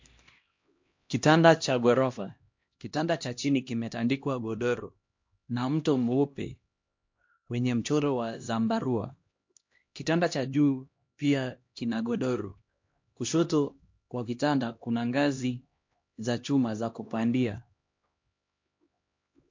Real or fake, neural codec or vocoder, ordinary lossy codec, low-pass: fake; codec, 16 kHz, 1 kbps, X-Codec, HuBERT features, trained on LibriSpeech; MP3, 48 kbps; 7.2 kHz